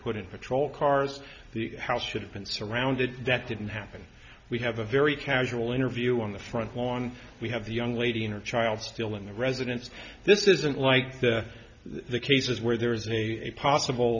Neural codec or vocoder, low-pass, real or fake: none; 7.2 kHz; real